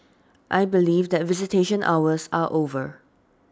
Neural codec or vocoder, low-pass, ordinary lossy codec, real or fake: none; none; none; real